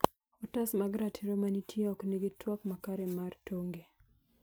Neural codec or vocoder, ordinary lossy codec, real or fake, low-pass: none; none; real; none